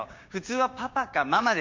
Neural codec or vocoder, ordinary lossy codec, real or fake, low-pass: codec, 16 kHz, 6 kbps, DAC; MP3, 48 kbps; fake; 7.2 kHz